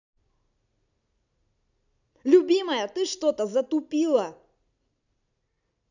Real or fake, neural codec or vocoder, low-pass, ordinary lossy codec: real; none; 7.2 kHz; none